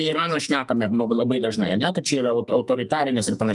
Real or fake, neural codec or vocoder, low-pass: fake; codec, 44.1 kHz, 2.6 kbps, SNAC; 10.8 kHz